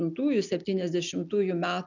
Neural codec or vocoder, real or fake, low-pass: none; real; 7.2 kHz